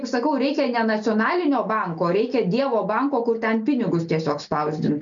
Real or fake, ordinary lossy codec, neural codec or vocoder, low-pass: real; MP3, 64 kbps; none; 7.2 kHz